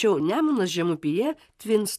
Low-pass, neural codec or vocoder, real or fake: 14.4 kHz; vocoder, 44.1 kHz, 128 mel bands, Pupu-Vocoder; fake